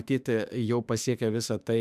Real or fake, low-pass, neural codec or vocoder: fake; 14.4 kHz; autoencoder, 48 kHz, 32 numbers a frame, DAC-VAE, trained on Japanese speech